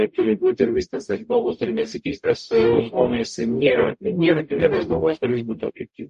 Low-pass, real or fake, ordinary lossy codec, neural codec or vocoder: 14.4 kHz; fake; MP3, 48 kbps; codec, 44.1 kHz, 0.9 kbps, DAC